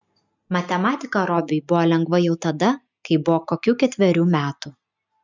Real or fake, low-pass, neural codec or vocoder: real; 7.2 kHz; none